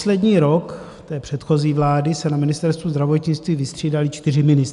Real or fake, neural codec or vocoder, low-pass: real; none; 10.8 kHz